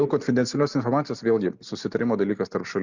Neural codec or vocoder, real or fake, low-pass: none; real; 7.2 kHz